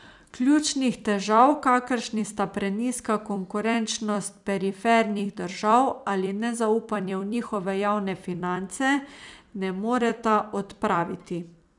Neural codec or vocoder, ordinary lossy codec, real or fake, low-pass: vocoder, 44.1 kHz, 128 mel bands every 256 samples, BigVGAN v2; none; fake; 10.8 kHz